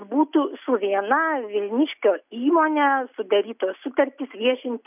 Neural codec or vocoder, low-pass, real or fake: none; 3.6 kHz; real